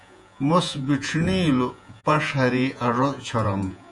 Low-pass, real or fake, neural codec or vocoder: 10.8 kHz; fake; vocoder, 48 kHz, 128 mel bands, Vocos